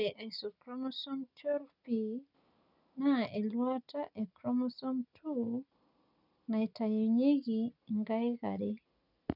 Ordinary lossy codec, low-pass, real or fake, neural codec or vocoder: none; 5.4 kHz; real; none